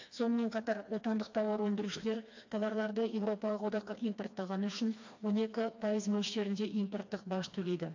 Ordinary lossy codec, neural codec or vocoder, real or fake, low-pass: AAC, 48 kbps; codec, 16 kHz, 2 kbps, FreqCodec, smaller model; fake; 7.2 kHz